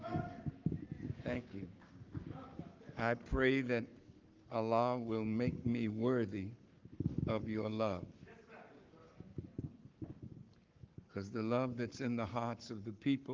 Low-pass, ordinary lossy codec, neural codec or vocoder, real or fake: 7.2 kHz; Opus, 32 kbps; codec, 44.1 kHz, 7.8 kbps, Pupu-Codec; fake